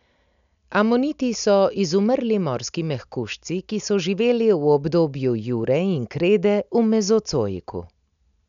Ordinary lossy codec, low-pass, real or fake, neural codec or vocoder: none; 7.2 kHz; real; none